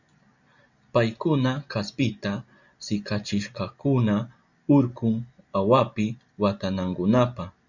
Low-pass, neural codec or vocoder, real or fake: 7.2 kHz; none; real